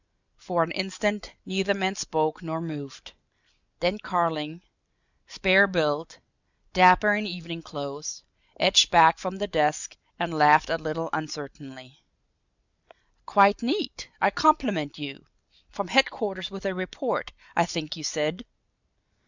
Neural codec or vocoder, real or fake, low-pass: none; real; 7.2 kHz